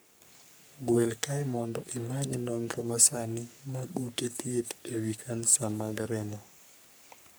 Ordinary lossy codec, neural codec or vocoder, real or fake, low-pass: none; codec, 44.1 kHz, 3.4 kbps, Pupu-Codec; fake; none